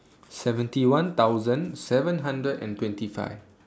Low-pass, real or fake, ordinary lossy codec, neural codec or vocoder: none; real; none; none